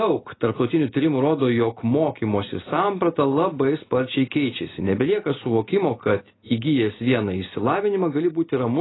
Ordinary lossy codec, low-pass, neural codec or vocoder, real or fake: AAC, 16 kbps; 7.2 kHz; none; real